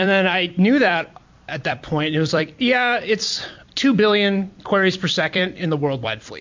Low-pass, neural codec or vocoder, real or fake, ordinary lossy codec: 7.2 kHz; vocoder, 44.1 kHz, 128 mel bands every 256 samples, BigVGAN v2; fake; MP3, 48 kbps